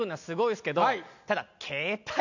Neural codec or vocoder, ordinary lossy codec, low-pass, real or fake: none; none; 7.2 kHz; real